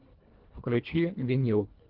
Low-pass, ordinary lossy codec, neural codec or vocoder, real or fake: 5.4 kHz; Opus, 32 kbps; codec, 24 kHz, 1.5 kbps, HILCodec; fake